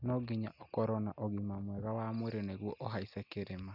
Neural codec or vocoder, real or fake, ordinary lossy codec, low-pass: none; real; none; 5.4 kHz